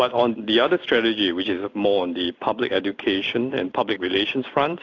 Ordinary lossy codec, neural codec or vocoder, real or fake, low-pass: AAC, 48 kbps; none; real; 7.2 kHz